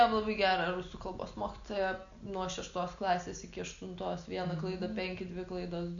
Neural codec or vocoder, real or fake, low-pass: none; real; 7.2 kHz